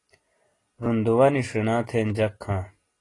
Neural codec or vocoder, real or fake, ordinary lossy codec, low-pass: none; real; AAC, 32 kbps; 10.8 kHz